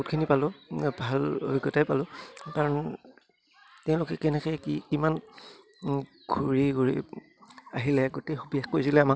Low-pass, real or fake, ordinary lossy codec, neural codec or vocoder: none; real; none; none